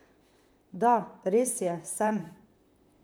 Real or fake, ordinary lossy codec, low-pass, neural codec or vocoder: fake; none; none; vocoder, 44.1 kHz, 128 mel bands, Pupu-Vocoder